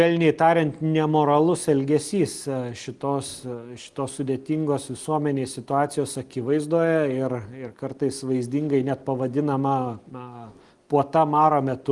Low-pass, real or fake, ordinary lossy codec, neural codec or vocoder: 10.8 kHz; real; Opus, 24 kbps; none